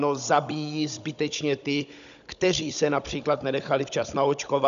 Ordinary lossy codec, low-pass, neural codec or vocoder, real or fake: MP3, 96 kbps; 7.2 kHz; codec, 16 kHz, 16 kbps, FunCodec, trained on Chinese and English, 50 frames a second; fake